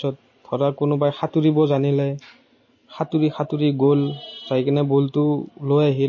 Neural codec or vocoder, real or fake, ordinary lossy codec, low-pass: none; real; MP3, 32 kbps; 7.2 kHz